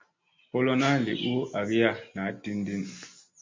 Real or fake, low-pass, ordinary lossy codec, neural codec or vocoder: real; 7.2 kHz; MP3, 48 kbps; none